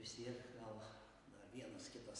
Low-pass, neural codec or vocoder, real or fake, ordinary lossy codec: 10.8 kHz; none; real; AAC, 48 kbps